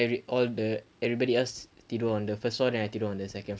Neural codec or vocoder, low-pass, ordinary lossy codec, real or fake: none; none; none; real